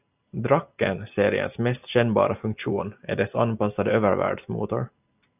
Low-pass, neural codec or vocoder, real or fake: 3.6 kHz; none; real